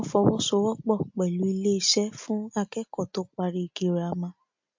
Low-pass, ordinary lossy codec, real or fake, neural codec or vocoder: 7.2 kHz; MP3, 48 kbps; real; none